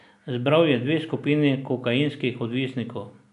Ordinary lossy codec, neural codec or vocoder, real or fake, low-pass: none; none; real; 10.8 kHz